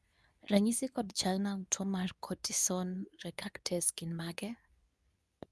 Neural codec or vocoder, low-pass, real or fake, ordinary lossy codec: codec, 24 kHz, 0.9 kbps, WavTokenizer, medium speech release version 2; none; fake; none